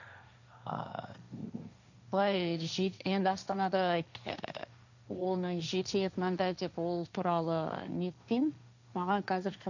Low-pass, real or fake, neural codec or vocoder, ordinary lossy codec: 7.2 kHz; fake; codec, 16 kHz, 1.1 kbps, Voila-Tokenizer; none